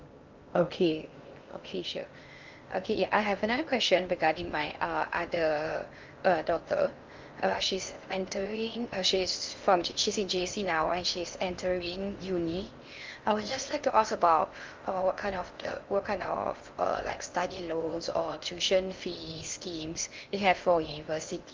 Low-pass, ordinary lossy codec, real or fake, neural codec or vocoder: 7.2 kHz; Opus, 24 kbps; fake; codec, 16 kHz in and 24 kHz out, 0.6 kbps, FocalCodec, streaming, 2048 codes